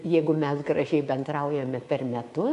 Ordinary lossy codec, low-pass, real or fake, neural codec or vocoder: AAC, 48 kbps; 10.8 kHz; fake; codec, 24 kHz, 3.1 kbps, DualCodec